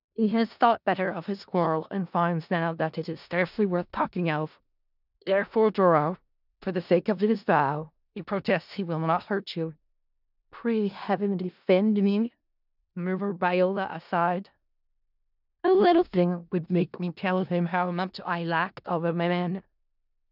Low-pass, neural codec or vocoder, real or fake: 5.4 kHz; codec, 16 kHz in and 24 kHz out, 0.4 kbps, LongCat-Audio-Codec, four codebook decoder; fake